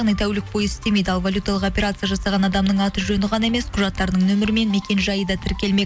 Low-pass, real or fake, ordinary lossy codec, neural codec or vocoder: none; real; none; none